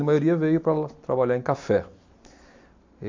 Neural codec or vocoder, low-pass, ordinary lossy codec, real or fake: none; 7.2 kHz; MP3, 64 kbps; real